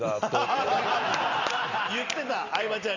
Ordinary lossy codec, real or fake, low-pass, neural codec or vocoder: Opus, 64 kbps; real; 7.2 kHz; none